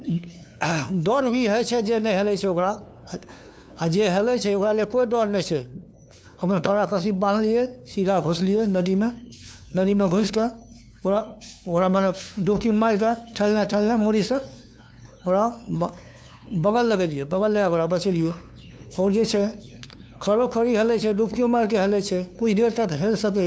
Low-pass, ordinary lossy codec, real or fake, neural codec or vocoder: none; none; fake; codec, 16 kHz, 2 kbps, FunCodec, trained on LibriTTS, 25 frames a second